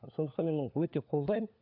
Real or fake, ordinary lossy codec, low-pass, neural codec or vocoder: fake; none; 5.4 kHz; codec, 16 kHz, 4 kbps, FunCodec, trained on LibriTTS, 50 frames a second